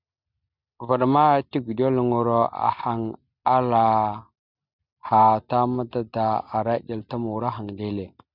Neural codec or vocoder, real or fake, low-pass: none; real; 5.4 kHz